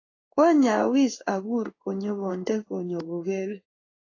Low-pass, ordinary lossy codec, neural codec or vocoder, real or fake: 7.2 kHz; AAC, 32 kbps; codec, 16 kHz in and 24 kHz out, 1 kbps, XY-Tokenizer; fake